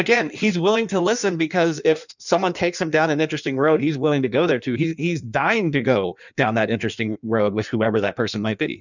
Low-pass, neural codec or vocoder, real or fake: 7.2 kHz; codec, 16 kHz in and 24 kHz out, 1.1 kbps, FireRedTTS-2 codec; fake